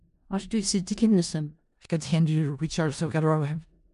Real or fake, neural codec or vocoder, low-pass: fake; codec, 16 kHz in and 24 kHz out, 0.4 kbps, LongCat-Audio-Codec, four codebook decoder; 10.8 kHz